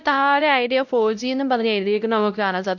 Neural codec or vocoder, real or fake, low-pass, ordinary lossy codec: codec, 16 kHz, 0.5 kbps, X-Codec, WavLM features, trained on Multilingual LibriSpeech; fake; 7.2 kHz; none